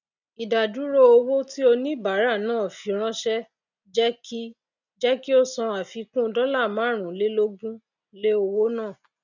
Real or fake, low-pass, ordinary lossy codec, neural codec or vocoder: real; 7.2 kHz; none; none